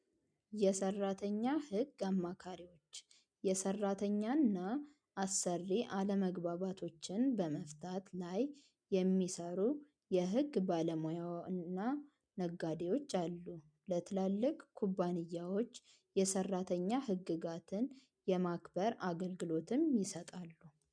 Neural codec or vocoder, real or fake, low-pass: none; real; 9.9 kHz